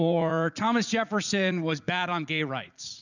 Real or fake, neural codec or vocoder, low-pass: fake; vocoder, 44.1 kHz, 80 mel bands, Vocos; 7.2 kHz